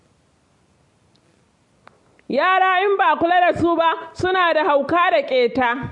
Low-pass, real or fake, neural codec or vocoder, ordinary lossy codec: 10.8 kHz; real; none; MP3, 48 kbps